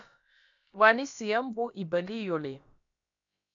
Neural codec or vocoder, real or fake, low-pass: codec, 16 kHz, about 1 kbps, DyCAST, with the encoder's durations; fake; 7.2 kHz